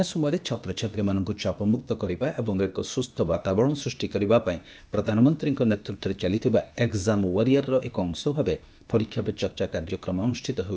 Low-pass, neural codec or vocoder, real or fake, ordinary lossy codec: none; codec, 16 kHz, 0.8 kbps, ZipCodec; fake; none